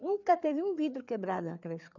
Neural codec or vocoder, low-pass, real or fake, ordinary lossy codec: codec, 16 kHz, 4 kbps, FreqCodec, larger model; 7.2 kHz; fake; none